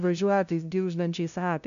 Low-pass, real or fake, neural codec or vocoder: 7.2 kHz; fake; codec, 16 kHz, 0.5 kbps, FunCodec, trained on LibriTTS, 25 frames a second